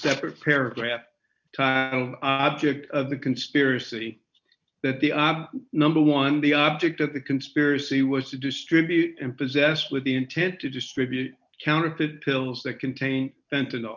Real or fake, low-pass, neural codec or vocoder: real; 7.2 kHz; none